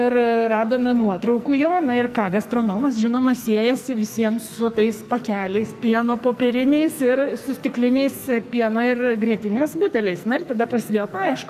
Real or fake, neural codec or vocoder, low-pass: fake; codec, 44.1 kHz, 2.6 kbps, SNAC; 14.4 kHz